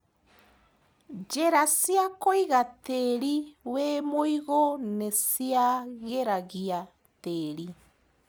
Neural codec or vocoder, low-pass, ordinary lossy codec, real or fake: none; none; none; real